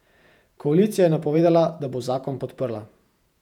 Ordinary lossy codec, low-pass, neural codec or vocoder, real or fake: none; 19.8 kHz; vocoder, 48 kHz, 128 mel bands, Vocos; fake